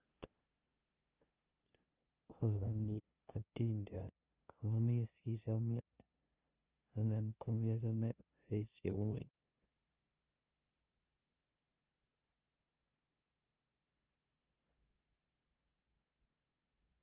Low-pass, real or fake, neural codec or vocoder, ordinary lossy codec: 3.6 kHz; fake; codec, 16 kHz, 0.5 kbps, FunCodec, trained on LibriTTS, 25 frames a second; Opus, 32 kbps